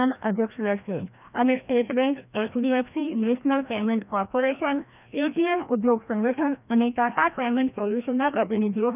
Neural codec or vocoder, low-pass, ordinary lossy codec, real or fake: codec, 16 kHz, 1 kbps, FreqCodec, larger model; 3.6 kHz; none; fake